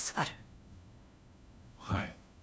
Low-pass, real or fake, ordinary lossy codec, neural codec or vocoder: none; fake; none; codec, 16 kHz, 1 kbps, FunCodec, trained on LibriTTS, 50 frames a second